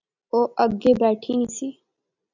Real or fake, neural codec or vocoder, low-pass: real; none; 7.2 kHz